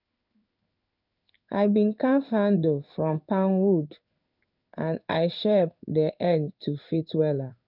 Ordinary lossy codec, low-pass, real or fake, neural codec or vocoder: none; 5.4 kHz; fake; codec, 16 kHz in and 24 kHz out, 1 kbps, XY-Tokenizer